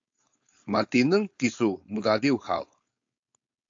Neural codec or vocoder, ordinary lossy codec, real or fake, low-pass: codec, 16 kHz, 4.8 kbps, FACodec; AAC, 48 kbps; fake; 7.2 kHz